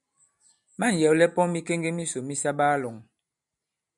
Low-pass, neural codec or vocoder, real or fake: 10.8 kHz; none; real